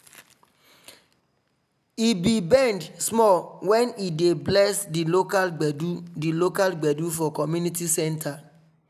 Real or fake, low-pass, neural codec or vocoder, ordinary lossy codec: real; 14.4 kHz; none; none